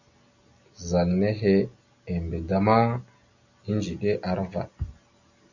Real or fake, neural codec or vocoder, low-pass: real; none; 7.2 kHz